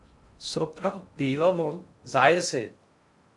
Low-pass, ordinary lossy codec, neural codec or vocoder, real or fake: 10.8 kHz; MP3, 64 kbps; codec, 16 kHz in and 24 kHz out, 0.6 kbps, FocalCodec, streaming, 2048 codes; fake